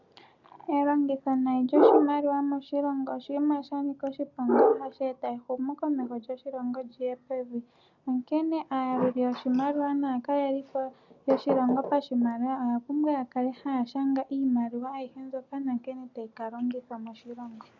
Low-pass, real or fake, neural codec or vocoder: 7.2 kHz; real; none